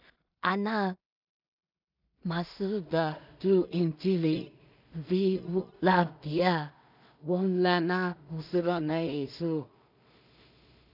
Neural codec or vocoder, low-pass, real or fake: codec, 16 kHz in and 24 kHz out, 0.4 kbps, LongCat-Audio-Codec, two codebook decoder; 5.4 kHz; fake